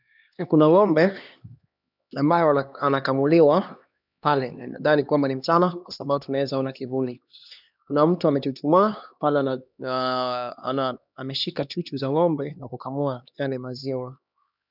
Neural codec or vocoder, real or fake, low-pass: codec, 16 kHz, 2 kbps, X-Codec, HuBERT features, trained on LibriSpeech; fake; 5.4 kHz